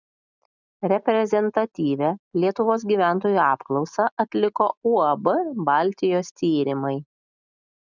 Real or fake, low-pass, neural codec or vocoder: real; 7.2 kHz; none